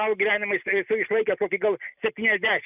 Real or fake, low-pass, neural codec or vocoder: real; 3.6 kHz; none